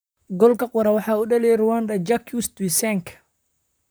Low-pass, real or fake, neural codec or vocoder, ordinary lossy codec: none; fake; vocoder, 44.1 kHz, 128 mel bands, Pupu-Vocoder; none